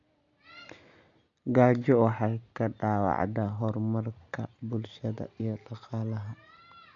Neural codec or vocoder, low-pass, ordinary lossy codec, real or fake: none; 7.2 kHz; none; real